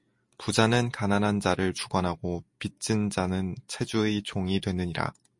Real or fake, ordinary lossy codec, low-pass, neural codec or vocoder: real; MP3, 48 kbps; 10.8 kHz; none